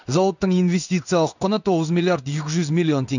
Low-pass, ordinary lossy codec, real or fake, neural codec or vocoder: 7.2 kHz; none; fake; codec, 16 kHz in and 24 kHz out, 1 kbps, XY-Tokenizer